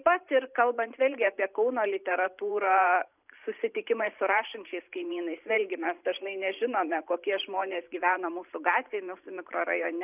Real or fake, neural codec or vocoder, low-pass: fake; vocoder, 44.1 kHz, 128 mel bands every 512 samples, BigVGAN v2; 3.6 kHz